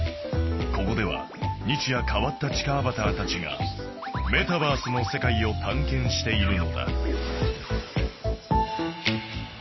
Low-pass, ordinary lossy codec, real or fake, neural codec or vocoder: 7.2 kHz; MP3, 24 kbps; real; none